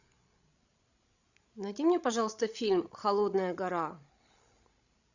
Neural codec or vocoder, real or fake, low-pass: codec, 16 kHz, 16 kbps, FreqCodec, larger model; fake; 7.2 kHz